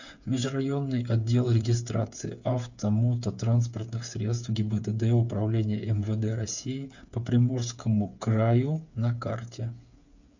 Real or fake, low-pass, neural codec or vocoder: fake; 7.2 kHz; codec, 16 kHz, 8 kbps, FreqCodec, smaller model